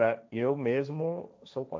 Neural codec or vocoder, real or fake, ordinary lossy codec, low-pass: codec, 16 kHz, 1.1 kbps, Voila-Tokenizer; fake; none; none